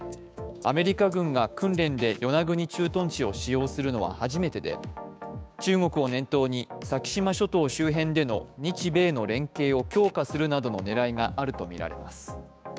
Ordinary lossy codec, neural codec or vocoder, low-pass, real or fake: none; codec, 16 kHz, 6 kbps, DAC; none; fake